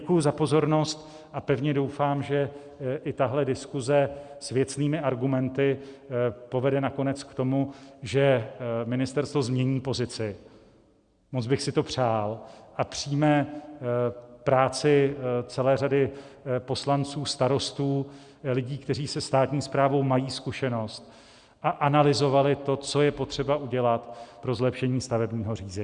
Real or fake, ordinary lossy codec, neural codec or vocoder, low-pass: real; Opus, 64 kbps; none; 9.9 kHz